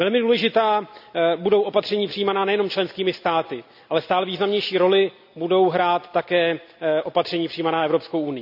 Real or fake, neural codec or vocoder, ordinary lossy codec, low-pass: real; none; none; 5.4 kHz